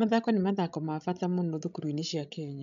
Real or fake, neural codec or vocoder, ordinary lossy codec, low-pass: fake; codec, 16 kHz, 16 kbps, FreqCodec, larger model; none; 7.2 kHz